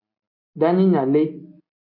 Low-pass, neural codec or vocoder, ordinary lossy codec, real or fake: 5.4 kHz; none; MP3, 48 kbps; real